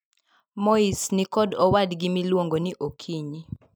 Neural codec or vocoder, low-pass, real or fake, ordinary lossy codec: none; none; real; none